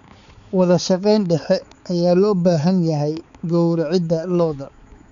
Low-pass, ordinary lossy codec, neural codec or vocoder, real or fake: 7.2 kHz; none; codec, 16 kHz, 4 kbps, X-Codec, HuBERT features, trained on balanced general audio; fake